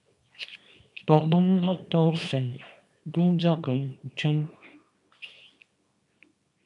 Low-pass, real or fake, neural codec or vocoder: 10.8 kHz; fake; codec, 24 kHz, 0.9 kbps, WavTokenizer, small release